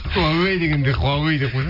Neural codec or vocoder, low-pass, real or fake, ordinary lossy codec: none; 5.4 kHz; real; none